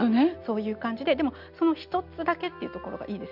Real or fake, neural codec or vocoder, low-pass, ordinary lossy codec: real; none; 5.4 kHz; none